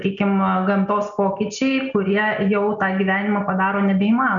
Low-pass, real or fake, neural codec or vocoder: 7.2 kHz; real; none